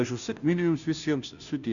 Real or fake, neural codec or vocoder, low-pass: fake; codec, 16 kHz, 0.5 kbps, FunCodec, trained on Chinese and English, 25 frames a second; 7.2 kHz